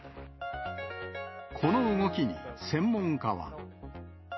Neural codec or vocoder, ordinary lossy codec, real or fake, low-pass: none; MP3, 24 kbps; real; 7.2 kHz